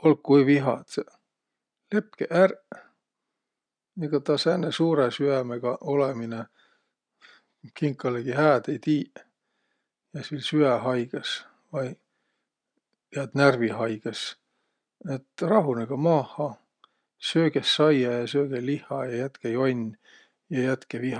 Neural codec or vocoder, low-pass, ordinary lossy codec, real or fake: none; 9.9 kHz; none; real